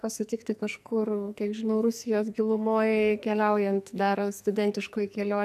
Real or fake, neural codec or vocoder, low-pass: fake; codec, 44.1 kHz, 2.6 kbps, SNAC; 14.4 kHz